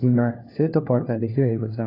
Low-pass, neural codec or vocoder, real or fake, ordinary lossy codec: 5.4 kHz; codec, 16 kHz, 1 kbps, FunCodec, trained on LibriTTS, 50 frames a second; fake; AAC, 24 kbps